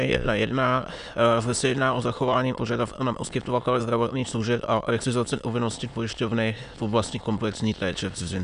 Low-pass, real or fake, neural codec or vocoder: 9.9 kHz; fake; autoencoder, 22.05 kHz, a latent of 192 numbers a frame, VITS, trained on many speakers